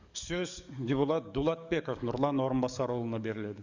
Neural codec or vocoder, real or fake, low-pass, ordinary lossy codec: codec, 44.1 kHz, 7.8 kbps, DAC; fake; 7.2 kHz; Opus, 64 kbps